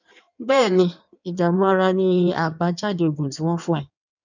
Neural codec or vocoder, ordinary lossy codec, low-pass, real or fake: codec, 16 kHz in and 24 kHz out, 1.1 kbps, FireRedTTS-2 codec; none; 7.2 kHz; fake